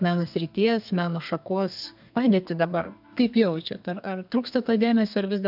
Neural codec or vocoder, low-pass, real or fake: codec, 32 kHz, 1.9 kbps, SNAC; 5.4 kHz; fake